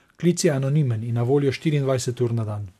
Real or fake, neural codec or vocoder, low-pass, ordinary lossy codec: real; none; 14.4 kHz; none